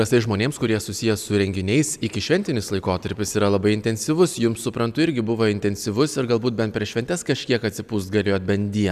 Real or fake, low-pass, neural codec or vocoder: real; 14.4 kHz; none